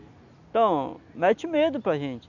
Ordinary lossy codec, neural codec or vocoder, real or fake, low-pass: none; none; real; 7.2 kHz